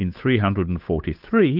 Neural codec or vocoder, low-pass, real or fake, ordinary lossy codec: codec, 16 kHz, 8 kbps, FunCodec, trained on Chinese and English, 25 frames a second; 5.4 kHz; fake; Opus, 32 kbps